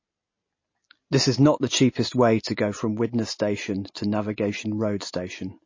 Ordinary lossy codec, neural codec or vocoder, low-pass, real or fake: MP3, 32 kbps; none; 7.2 kHz; real